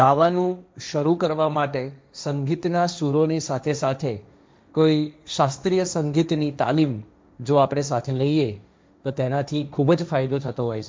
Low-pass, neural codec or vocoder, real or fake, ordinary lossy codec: none; codec, 16 kHz, 1.1 kbps, Voila-Tokenizer; fake; none